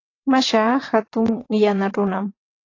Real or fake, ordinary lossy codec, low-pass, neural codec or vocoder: fake; AAC, 32 kbps; 7.2 kHz; vocoder, 22.05 kHz, 80 mel bands, WaveNeXt